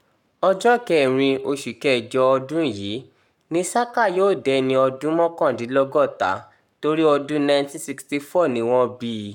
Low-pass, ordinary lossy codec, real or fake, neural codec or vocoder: 19.8 kHz; none; fake; codec, 44.1 kHz, 7.8 kbps, Pupu-Codec